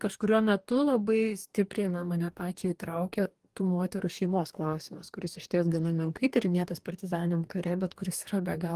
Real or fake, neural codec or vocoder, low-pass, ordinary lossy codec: fake; codec, 44.1 kHz, 2.6 kbps, DAC; 14.4 kHz; Opus, 24 kbps